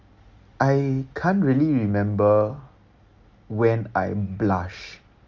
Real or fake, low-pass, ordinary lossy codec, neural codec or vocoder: real; 7.2 kHz; Opus, 32 kbps; none